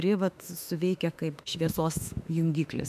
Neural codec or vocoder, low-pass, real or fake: autoencoder, 48 kHz, 32 numbers a frame, DAC-VAE, trained on Japanese speech; 14.4 kHz; fake